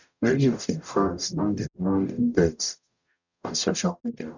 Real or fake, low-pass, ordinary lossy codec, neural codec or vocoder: fake; 7.2 kHz; none; codec, 44.1 kHz, 0.9 kbps, DAC